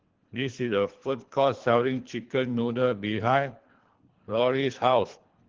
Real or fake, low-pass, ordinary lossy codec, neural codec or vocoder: fake; 7.2 kHz; Opus, 16 kbps; codec, 24 kHz, 3 kbps, HILCodec